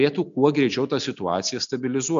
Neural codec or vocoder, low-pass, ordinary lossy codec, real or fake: none; 7.2 kHz; MP3, 64 kbps; real